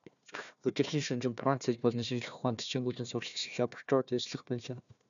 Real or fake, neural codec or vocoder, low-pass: fake; codec, 16 kHz, 1 kbps, FunCodec, trained on Chinese and English, 50 frames a second; 7.2 kHz